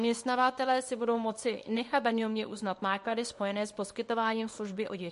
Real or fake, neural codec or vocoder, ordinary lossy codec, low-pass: fake; codec, 24 kHz, 0.9 kbps, WavTokenizer, small release; MP3, 48 kbps; 10.8 kHz